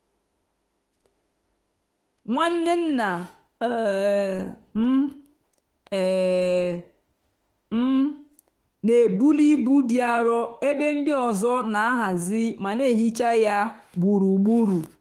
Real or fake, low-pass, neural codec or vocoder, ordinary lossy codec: fake; 19.8 kHz; autoencoder, 48 kHz, 32 numbers a frame, DAC-VAE, trained on Japanese speech; Opus, 24 kbps